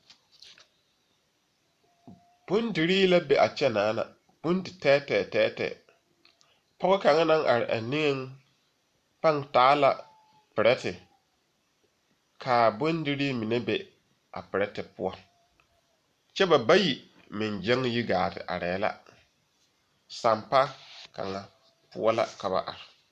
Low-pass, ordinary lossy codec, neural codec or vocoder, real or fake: 14.4 kHz; MP3, 96 kbps; vocoder, 44.1 kHz, 128 mel bands every 512 samples, BigVGAN v2; fake